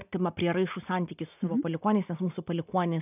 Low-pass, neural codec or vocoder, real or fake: 3.6 kHz; none; real